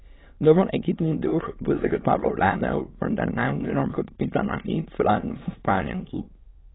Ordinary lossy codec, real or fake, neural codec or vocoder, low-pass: AAC, 16 kbps; fake; autoencoder, 22.05 kHz, a latent of 192 numbers a frame, VITS, trained on many speakers; 7.2 kHz